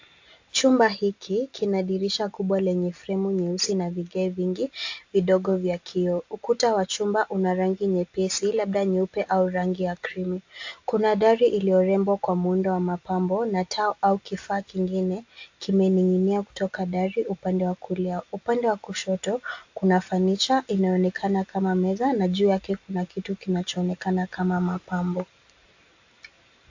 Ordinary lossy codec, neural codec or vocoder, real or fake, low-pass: AAC, 48 kbps; none; real; 7.2 kHz